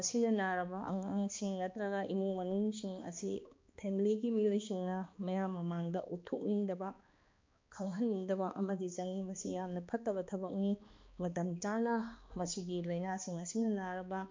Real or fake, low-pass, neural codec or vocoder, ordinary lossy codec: fake; 7.2 kHz; codec, 16 kHz, 2 kbps, X-Codec, HuBERT features, trained on balanced general audio; MP3, 64 kbps